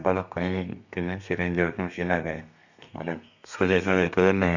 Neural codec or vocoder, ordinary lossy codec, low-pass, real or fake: codec, 32 kHz, 1.9 kbps, SNAC; none; 7.2 kHz; fake